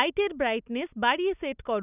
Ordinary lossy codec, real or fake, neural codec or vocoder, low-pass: none; real; none; 3.6 kHz